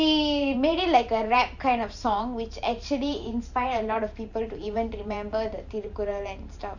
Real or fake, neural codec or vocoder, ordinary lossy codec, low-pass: fake; vocoder, 44.1 kHz, 128 mel bands every 512 samples, BigVGAN v2; none; 7.2 kHz